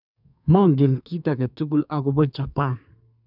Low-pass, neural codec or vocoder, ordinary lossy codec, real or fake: 5.4 kHz; codec, 32 kHz, 1.9 kbps, SNAC; none; fake